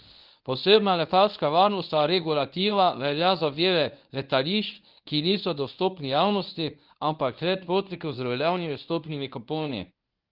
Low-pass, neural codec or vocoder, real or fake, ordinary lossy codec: 5.4 kHz; codec, 24 kHz, 0.9 kbps, WavTokenizer, medium speech release version 1; fake; Opus, 24 kbps